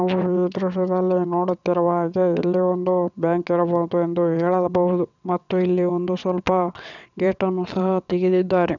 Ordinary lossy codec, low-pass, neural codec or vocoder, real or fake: none; 7.2 kHz; vocoder, 22.05 kHz, 80 mel bands, WaveNeXt; fake